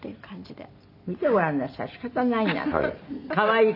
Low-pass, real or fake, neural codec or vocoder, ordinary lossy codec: 5.4 kHz; real; none; none